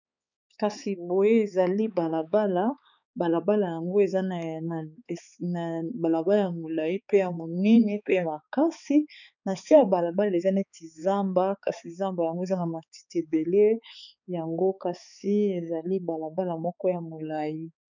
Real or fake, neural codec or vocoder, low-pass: fake; codec, 16 kHz, 4 kbps, X-Codec, HuBERT features, trained on balanced general audio; 7.2 kHz